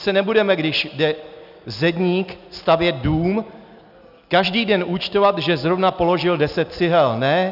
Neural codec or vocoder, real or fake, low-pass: none; real; 5.4 kHz